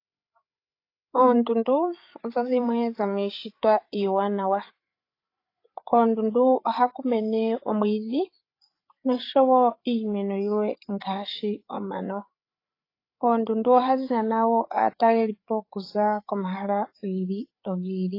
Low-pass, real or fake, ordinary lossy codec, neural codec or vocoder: 5.4 kHz; fake; AAC, 32 kbps; codec, 16 kHz, 8 kbps, FreqCodec, larger model